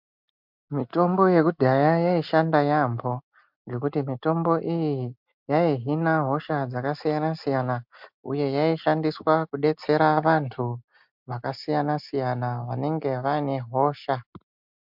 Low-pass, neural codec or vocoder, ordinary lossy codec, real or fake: 5.4 kHz; none; MP3, 48 kbps; real